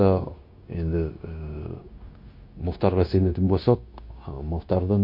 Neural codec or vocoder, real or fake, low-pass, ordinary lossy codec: codec, 16 kHz, 0.9 kbps, LongCat-Audio-Codec; fake; 5.4 kHz; MP3, 32 kbps